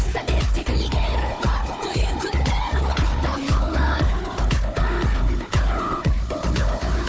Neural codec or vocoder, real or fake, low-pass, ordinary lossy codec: codec, 16 kHz, 4 kbps, FunCodec, trained on Chinese and English, 50 frames a second; fake; none; none